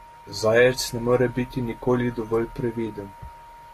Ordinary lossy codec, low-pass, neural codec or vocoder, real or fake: AAC, 48 kbps; 14.4 kHz; none; real